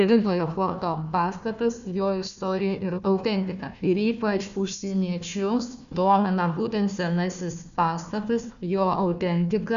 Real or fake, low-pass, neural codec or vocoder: fake; 7.2 kHz; codec, 16 kHz, 1 kbps, FunCodec, trained on Chinese and English, 50 frames a second